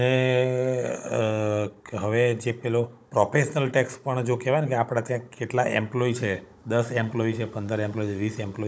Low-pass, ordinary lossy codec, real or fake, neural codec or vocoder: none; none; fake; codec, 16 kHz, 16 kbps, FunCodec, trained on Chinese and English, 50 frames a second